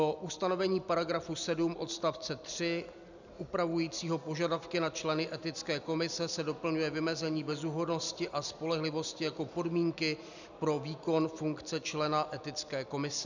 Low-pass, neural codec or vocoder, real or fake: 7.2 kHz; none; real